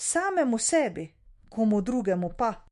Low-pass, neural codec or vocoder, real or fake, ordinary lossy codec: 14.4 kHz; autoencoder, 48 kHz, 128 numbers a frame, DAC-VAE, trained on Japanese speech; fake; MP3, 48 kbps